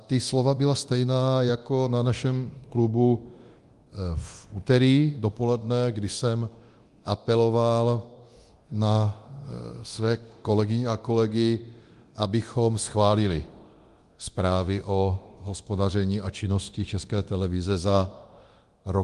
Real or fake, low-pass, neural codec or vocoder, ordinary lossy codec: fake; 10.8 kHz; codec, 24 kHz, 0.9 kbps, DualCodec; Opus, 24 kbps